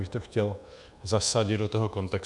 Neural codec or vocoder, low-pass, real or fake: codec, 24 kHz, 1.2 kbps, DualCodec; 10.8 kHz; fake